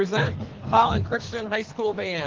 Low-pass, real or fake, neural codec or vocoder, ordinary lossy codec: 7.2 kHz; fake; codec, 24 kHz, 3 kbps, HILCodec; Opus, 16 kbps